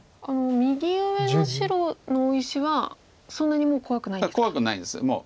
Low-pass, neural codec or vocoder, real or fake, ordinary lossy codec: none; none; real; none